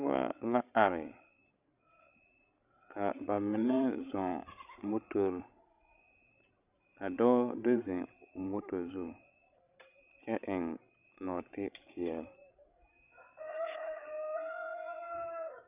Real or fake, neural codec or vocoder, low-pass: fake; codec, 16 kHz, 16 kbps, FreqCodec, larger model; 3.6 kHz